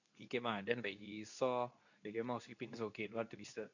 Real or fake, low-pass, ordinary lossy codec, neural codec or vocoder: fake; 7.2 kHz; none; codec, 24 kHz, 0.9 kbps, WavTokenizer, medium speech release version 2